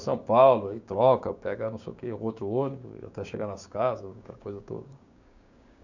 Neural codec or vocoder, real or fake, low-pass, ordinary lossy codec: codec, 16 kHz, 6 kbps, DAC; fake; 7.2 kHz; none